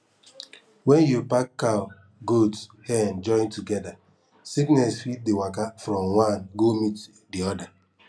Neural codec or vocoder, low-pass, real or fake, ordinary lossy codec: none; none; real; none